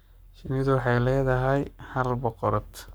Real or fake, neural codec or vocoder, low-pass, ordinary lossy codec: fake; codec, 44.1 kHz, 7.8 kbps, Pupu-Codec; none; none